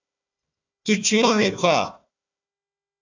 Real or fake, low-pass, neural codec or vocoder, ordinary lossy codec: fake; 7.2 kHz; codec, 16 kHz, 1 kbps, FunCodec, trained on Chinese and English, 50 frames a second; AAC, 48 kbps